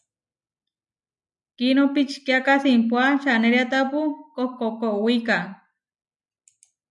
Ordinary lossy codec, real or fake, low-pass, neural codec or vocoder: MP3, 96 kbps; real; 10.8 kHz; none